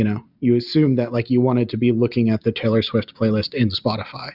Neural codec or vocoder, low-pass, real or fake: none; 5.4 kHz; real